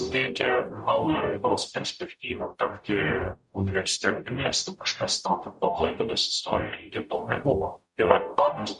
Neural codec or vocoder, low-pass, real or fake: codec, 44.1 kHz, 0.9 kbps, DAC; 10.8 kHz; fake